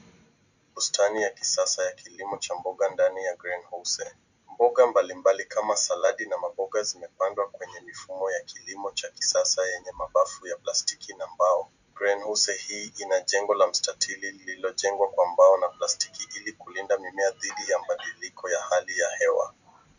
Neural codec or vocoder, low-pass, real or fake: none; 7.2 kHz; real